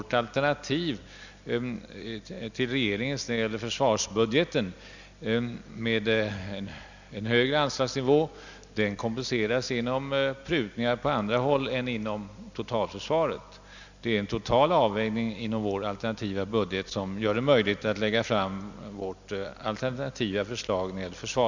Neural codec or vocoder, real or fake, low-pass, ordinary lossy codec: none; real; 7.2 kHz; none